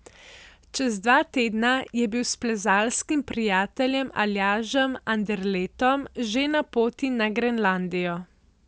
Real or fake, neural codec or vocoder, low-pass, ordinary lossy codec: real; none; none; none